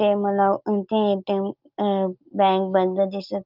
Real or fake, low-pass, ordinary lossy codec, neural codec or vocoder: real; 5.4 kHz; Opus, 24 kbps; none